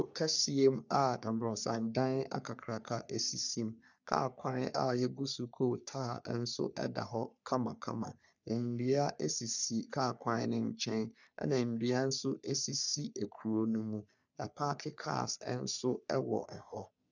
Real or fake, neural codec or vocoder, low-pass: fake; codec, 44.1 kHz, 3.4 kbps, Pupu-Codec; 7.2 kHz